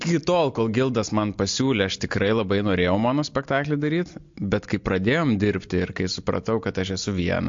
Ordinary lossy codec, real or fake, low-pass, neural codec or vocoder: MP3, 48 kbps; real; 7.2 kHz; none